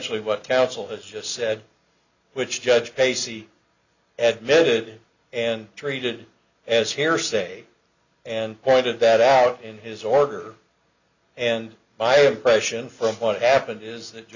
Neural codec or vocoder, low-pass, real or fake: none; 7.2 kHz; real